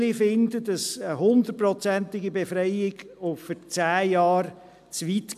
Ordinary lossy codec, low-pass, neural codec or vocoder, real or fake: MP3, 96 kbps; 14.4 kHz; none; real